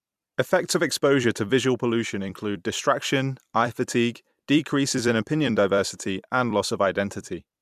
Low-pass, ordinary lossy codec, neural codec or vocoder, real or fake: 14.4 kHz; MP3, 96 kbps; vocoder, 44.1 kHz, 128 mel bands every 256 samples, BigVGAN v2; fake